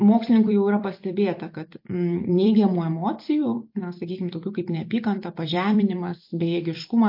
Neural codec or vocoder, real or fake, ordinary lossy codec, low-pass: vocoder, 44.1 kHz, 128 mel bands every 256 samples, BigVGAN v2; fake; MP3, 32 kbps; 5.4 kHz